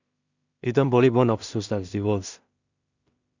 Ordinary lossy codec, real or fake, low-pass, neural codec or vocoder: Opus, 64 kbps; fake; 7.2 kHz; codec, 16 kHz in and 24 kHz out, 0.4 kbps, LongCat-Audio-Codec, two codebook decoder